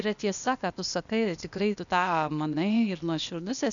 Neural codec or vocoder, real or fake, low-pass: codec, 16 kHz, 0.8 kbps, ZipCodec; fake; 7.2 kHz